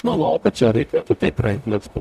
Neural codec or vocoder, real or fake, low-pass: codec, 44.1 kHz, 0.9 kbps, DAC; fake; 14.4 kHz